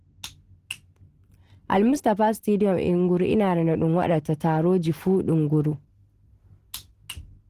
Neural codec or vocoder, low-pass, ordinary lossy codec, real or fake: none; 14.4 kHz; Opus, 16 kbps; real